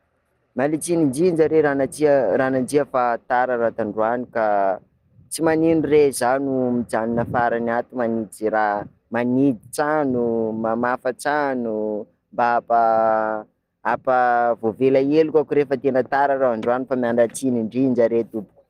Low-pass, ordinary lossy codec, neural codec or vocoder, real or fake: 14.4 kHz; Opus, 24 kbps; none; real